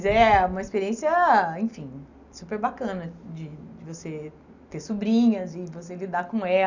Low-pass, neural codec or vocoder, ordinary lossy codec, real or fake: 7.2 kHz; none; none; real